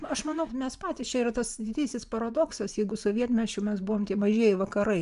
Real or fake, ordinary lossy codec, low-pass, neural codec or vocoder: fake; AAC, 96 kbps; 10.8 kHz; vocoder, 24 kHz, 100 mel bands, Vocos